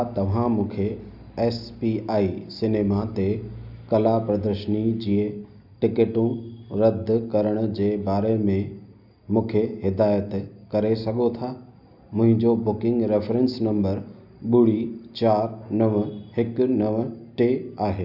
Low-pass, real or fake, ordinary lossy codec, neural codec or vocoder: 5.4 kHz; real; none; none